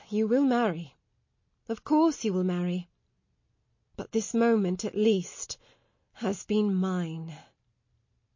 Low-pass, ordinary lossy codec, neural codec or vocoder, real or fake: 7.2 kHz; MP3, 32 kbps; none; real